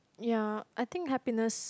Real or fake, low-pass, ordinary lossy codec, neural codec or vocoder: real; none; none; none